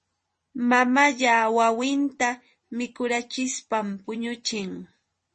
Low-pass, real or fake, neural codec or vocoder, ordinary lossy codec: 10.8 kHz; fake; vocoder, 44.1 kHz, 128 mel bands every 256 samples, BigVGAN v2; MP3, 32 kbps